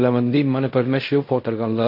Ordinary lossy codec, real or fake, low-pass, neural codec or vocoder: MP3, 32 kbps; fake; 5.4 kHz; codec, 16 kHz in and 24 kHz out, 0.4 kbps, LongCat-Audio-Codec, fine tuned four codebook decoder